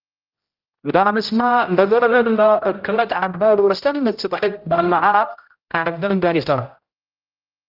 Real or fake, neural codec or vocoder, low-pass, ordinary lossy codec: fake; codec, 16 kHz, 0.5 kbps, X-Codec, HuBERT features, trained on general audio; 5.4 kHz; Opus, 32 kbps